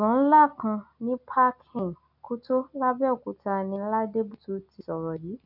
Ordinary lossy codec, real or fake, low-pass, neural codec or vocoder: none; real; 5.4 kHz; none